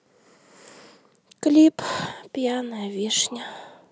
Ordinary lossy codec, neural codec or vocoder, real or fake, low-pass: none; none; real; none